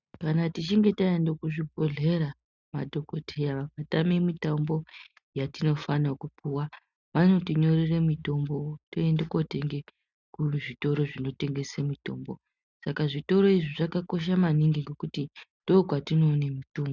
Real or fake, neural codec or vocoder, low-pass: real; none; 7.2 kHz